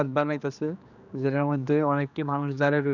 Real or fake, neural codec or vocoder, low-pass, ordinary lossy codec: fake; codec, 16 kHz, 2 kbps, X-Codec, HuBERT features, trained on general audio; 7.2 kHz; none